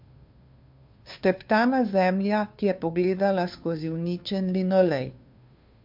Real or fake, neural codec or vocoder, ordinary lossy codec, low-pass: fake; codec, 16 kHz, 2 kbps, FunCodec, trained on Chinese and English, 25 frames a second; MP3, 48 kbps; 5.4 kHz